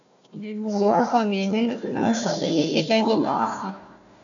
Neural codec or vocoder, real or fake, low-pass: codec, 16 kHz, 1 kbps, FunCodec, trained on Chinese and English, 50 frames a second; fake; 7.2 kHz